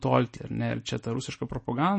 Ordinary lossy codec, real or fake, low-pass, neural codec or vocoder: MP3, 32 kbps; real; 10.8 kHz; none